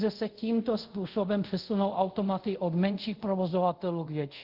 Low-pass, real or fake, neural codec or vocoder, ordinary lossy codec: 5.4 kHz; fake; codec, 24 kHz, 0.5 kbps, DualCodec; Opus, 32 kbps